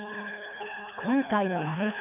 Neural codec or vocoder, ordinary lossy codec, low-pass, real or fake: codec, 24 kHz, 3 kbps, HILCodec; none; 3.6 kHz; fake